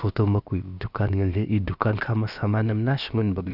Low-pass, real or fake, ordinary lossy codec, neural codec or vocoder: 5.4 kHz; fake; none; codec, 16 kHz, about 1 kbps, DyCAST, with the encoder's durations